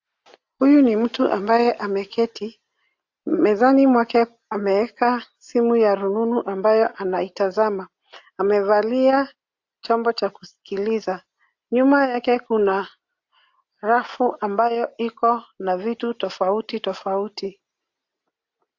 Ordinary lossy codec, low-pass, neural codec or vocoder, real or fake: AAC, 48 kbps; 7.2 kHz; none; real